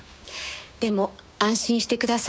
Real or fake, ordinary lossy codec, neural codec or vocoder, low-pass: fake; none; codec, 16 kHz, 6 kbps, DAC; none